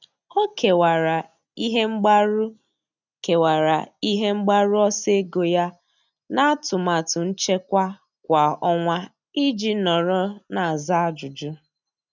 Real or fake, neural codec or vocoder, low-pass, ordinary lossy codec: real; none; 7.2 kHz; none